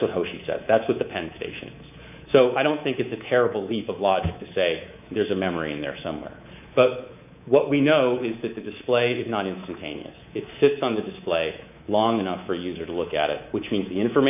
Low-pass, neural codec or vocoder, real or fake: 3.6 kHz; codec, 24 kHz, 3.1 kbps, DualCodec; fake